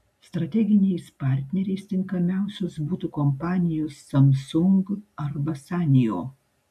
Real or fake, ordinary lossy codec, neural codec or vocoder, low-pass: real; AAC, 96 kbps; none; 14.4 kHz